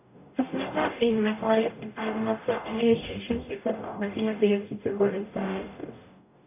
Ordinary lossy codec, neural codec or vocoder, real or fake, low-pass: none; codec, 44.1 kHz, 0.9 kbps, DAC; fake; 3.6 kHz